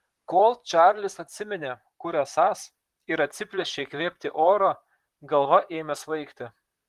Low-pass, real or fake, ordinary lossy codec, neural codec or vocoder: 14.4 kHz; fake; Opus, 24 kbps; vocoder, 44.1 kHz, 128 mel bands, Pupu-Vocoder